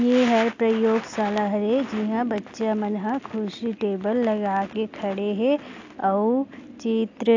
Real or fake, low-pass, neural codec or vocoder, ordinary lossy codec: real; 7.2 kHz; none; AAC, 48 kbps